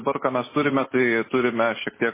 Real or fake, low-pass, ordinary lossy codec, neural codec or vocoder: real; 3.6 kHz; MP3, 16 kbps; none